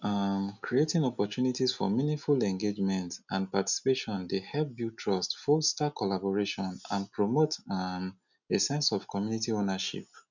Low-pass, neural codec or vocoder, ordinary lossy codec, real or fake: 7.2 kHz; none; none; real